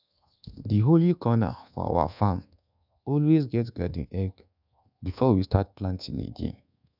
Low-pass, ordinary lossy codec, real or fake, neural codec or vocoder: 5.4 kHz; none; fake; codec, 24 kHz, 1.2 kbps, DualCodec